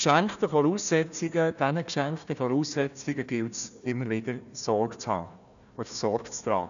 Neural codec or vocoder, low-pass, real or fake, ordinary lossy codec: codec, 16 kHz, 1 kbps, FunCodec, trained on Chinese and English, 50 frames a second; 7.2 kHz; fake; none